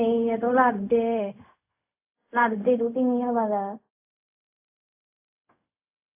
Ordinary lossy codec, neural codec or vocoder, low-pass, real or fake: AAC, 32 kbps; codec, 16 kHz, 0.4 kbps, LongCat-Audio-Codec; 3.6 kHz; fake